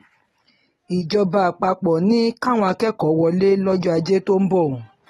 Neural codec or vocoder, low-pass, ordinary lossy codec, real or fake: none; 19.8 kHz; AAC, 32 kbps; real